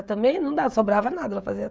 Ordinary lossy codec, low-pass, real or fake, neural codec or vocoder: none; none; fake; codec, 16 kHz, 16 kbps, FreqCodec, smaller model